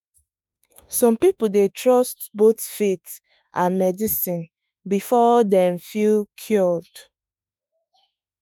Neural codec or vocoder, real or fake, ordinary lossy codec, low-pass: autoencoder, 48 kHz, 32 numbers a frame, DAC-VAE, trained on Japanese speech; fake; none; none